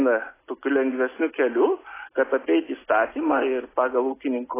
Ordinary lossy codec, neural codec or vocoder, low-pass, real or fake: AAC, 16 kbps; none; 3.6 kHz; real